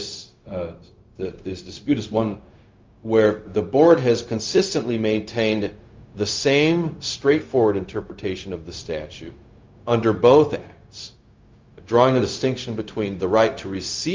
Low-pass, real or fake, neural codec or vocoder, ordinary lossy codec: 7.2 kHz; fake; codec, 16 kHz, 0.4 kbps, LongCat-Audio-Codec; Opus, 24 kbps